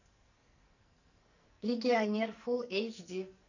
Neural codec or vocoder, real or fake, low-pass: codec, 44.1 kHz, 2.6 kbps, SNAC; fake; 7.2 kHz